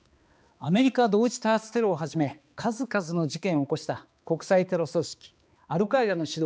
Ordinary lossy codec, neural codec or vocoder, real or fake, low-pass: none; codec, 16 kHz, 2 kbps, X-Codec, HuBERT features, trained on balanced general audio; fake; none